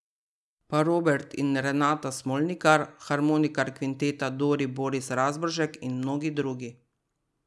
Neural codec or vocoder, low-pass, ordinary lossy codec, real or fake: none; none; none; real